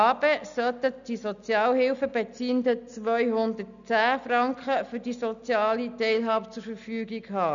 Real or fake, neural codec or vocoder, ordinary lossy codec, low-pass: real; none; none; 7.2 kHz